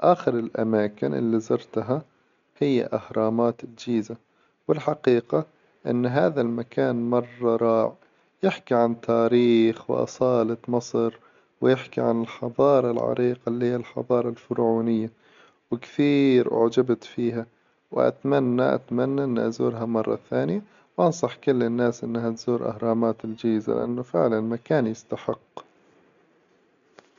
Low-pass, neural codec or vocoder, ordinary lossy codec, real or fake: 7.2 kHz; none; MP3, 64 kbps; real